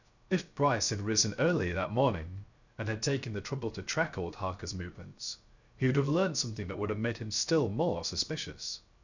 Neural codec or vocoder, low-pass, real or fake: codec, 16 kHz, 0.3 kbps, FocalCodec; 7.2 kHz; fake